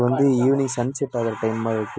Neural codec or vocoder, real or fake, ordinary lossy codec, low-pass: none; real; none; none